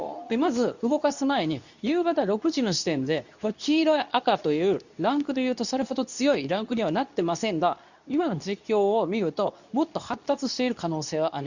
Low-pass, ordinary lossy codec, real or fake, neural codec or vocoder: 7.2 kHz; none; fake; codec, 24 kHz, 0.9 kbps, WavTokenizer, medium speech release version 2